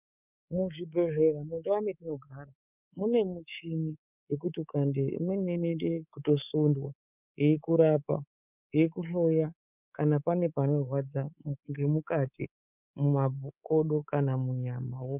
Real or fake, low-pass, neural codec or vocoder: fake; 3.6 kHz; codec, 24 kHz, 3.1 kbps, DualCodec